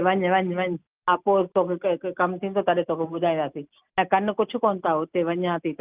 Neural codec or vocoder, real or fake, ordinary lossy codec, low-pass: none; real; Opus, 32 kbps; 3.6 kHz